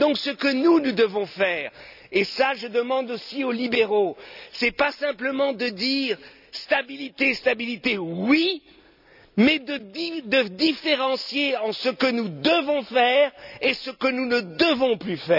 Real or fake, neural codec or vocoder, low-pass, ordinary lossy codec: real; none; 5.4 kHz; none